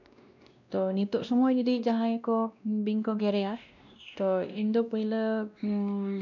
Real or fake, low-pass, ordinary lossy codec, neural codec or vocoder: fake; 7.2 kHz; none; codec, 16 kHz, 1 kbps, X-Codec, WavLM features, trained on Multilingual LibriSpeech